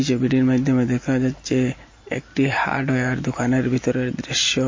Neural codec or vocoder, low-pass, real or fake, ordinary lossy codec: none; 7.2 kHz; real; MP3, 32 kbps